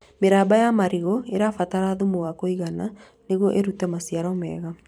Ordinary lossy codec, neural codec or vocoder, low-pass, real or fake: none; vocoder, 44.1 kHz, 128 mel bands every 512 samples, BigVGAN v2; 19.8 kHz; fake